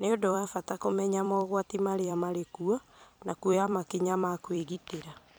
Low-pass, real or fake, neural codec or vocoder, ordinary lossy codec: none; fake; vocoder, 44.1 kHz, 128 mel bands every 256 samples, BigVGAN v2; none